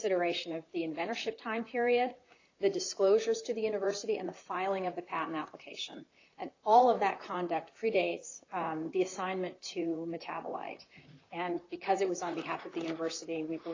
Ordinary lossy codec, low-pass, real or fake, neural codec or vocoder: AAC, 32 kbps; 7.2 kHz; fake; vocoder, 44.1 kHz, 128 mel bands, Pupu-Vocoder